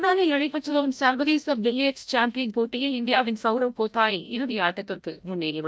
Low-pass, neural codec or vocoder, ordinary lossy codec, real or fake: none; codec, 16 kHz, 0.5 kbps, FreqCodec, larger model; none; fake